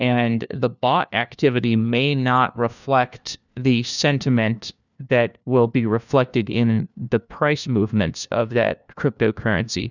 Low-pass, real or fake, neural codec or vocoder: 7.2 kHz; fake; codec, 16 kHz, 1 kbps, FunCodec, trained on LibriTTS, 50 frames a second